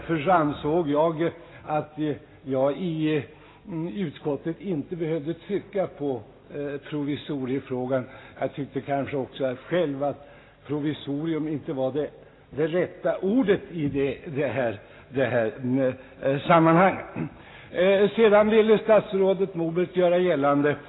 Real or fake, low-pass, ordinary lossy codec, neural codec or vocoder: real; 7.2 kHz; AAC, 16 kbps; none